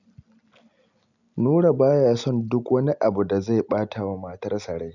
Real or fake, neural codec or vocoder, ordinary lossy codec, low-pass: real; none; none; 7.2 kHz